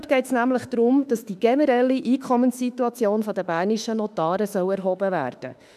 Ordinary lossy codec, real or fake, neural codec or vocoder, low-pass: none; fake; autoencoder, 48 kHz, 32 numbers a frame, DAC-VAE, trained on Japanese speech; 14.4 kHz